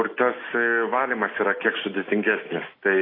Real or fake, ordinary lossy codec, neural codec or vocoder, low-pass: real; AAC, 24 kbps; none; 5.4 kHz